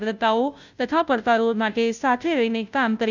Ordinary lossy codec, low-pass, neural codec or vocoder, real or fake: none; 7.2 kHz; codec, 16 kHz, 0.5 kbps, FunCodec, trained on Chinese and English, 25 frames a second; fake